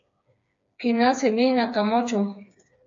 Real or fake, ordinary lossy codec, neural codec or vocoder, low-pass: fake; AAC, 48 kbps; codec, 16 kHz, 4 kbps, FreqCodec, smaller model; 7.2 kHz